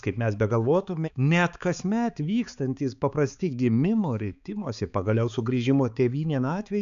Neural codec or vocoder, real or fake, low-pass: codec, 16 kHz, 4 kbps, X-Codec, HuBERT features, trained on balanced general audio; fake; 7.2 kHz